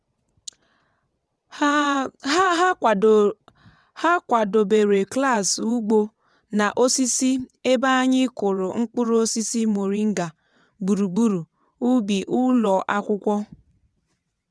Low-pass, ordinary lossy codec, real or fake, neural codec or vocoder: none; none; fake; vocoder, 22.05 kHz, 80 mel bands, WaveNeXt